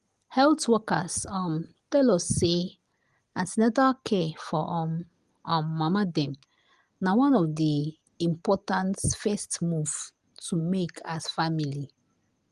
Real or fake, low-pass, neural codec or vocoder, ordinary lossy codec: real; 10.8 kHz; none; Opus, 24 kbps